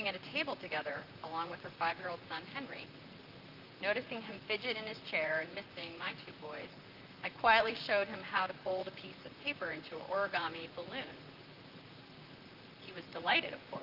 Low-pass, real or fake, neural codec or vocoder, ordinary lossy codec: 5.4 kHz; fake; vocoder, 44.1 kHz, 128 mel bands, Pupu-Vocoder; Opus, 32 kbps